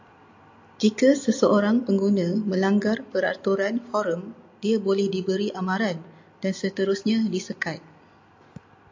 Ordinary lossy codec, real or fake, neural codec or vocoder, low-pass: AAC, 48 kbps; real; none; 7.2 kHz